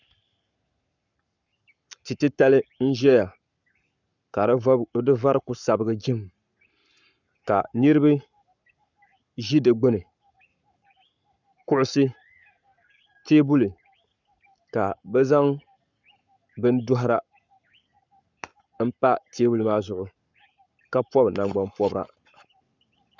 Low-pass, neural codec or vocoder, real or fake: 7.2 kHz; codec, 44.1 kHz, 7.8 kbps, Pupu-Codec; fake